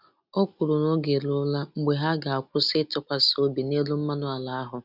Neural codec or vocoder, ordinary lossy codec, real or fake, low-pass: none; none; real; 5.4 kHz